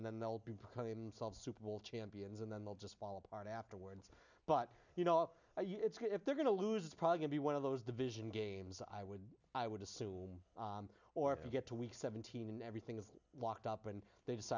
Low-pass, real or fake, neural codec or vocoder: 7.2 kHz; real; none